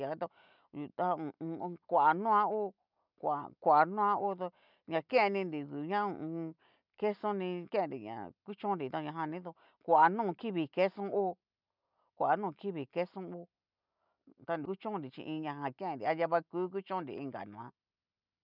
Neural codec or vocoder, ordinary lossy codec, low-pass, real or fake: none; none; 5.4 kHz; real